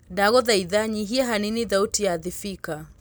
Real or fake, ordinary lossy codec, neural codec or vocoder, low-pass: real; none; none; none